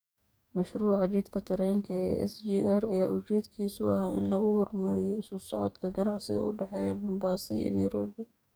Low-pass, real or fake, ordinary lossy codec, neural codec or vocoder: none; fake; none; codec, 44.1 kHz, 2.6 kbps, DAC